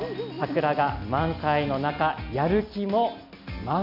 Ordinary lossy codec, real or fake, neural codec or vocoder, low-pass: none; real; none; 5.4 kHz